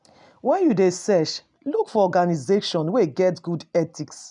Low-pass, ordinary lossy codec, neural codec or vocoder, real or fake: 10.8 kHz; none; none; real